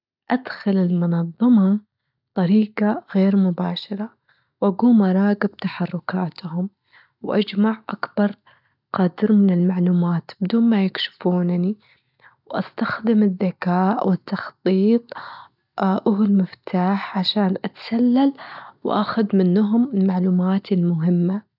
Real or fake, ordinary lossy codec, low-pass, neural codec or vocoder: real; none; 5.4 kHz; none